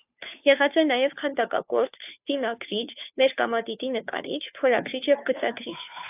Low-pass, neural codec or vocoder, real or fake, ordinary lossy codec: 3.6 kHz; codec, 16 kHz, 2 kbps, FunCodec, trained on Chinese and English, 25 frames a second; fake; AAC, 32 kbps